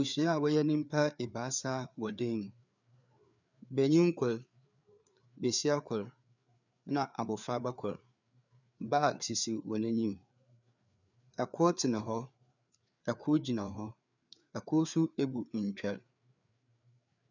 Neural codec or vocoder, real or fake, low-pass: codec, 16 kHz, 4 kbps, FreqCodec, larger model; fake; 7.2 kHz